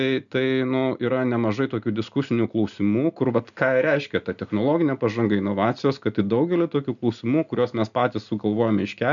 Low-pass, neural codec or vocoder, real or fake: 7.2 kHz; none; real